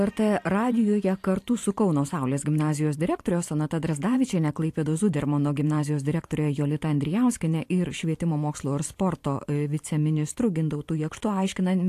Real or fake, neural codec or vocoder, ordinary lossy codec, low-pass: fake; vocoder, 44.1 kHz, 128 mel bands every 512 samples, BigVGAN v2; AAC, 64 kbps; 14.4 kHz